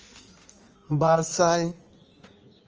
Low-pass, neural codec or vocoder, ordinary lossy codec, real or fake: 7.2 kHz; codec, 16 kHz in and 24 kHz out, 1.1 kbps, FireRedTTS-2 codec; Opus, 24 kbps; fake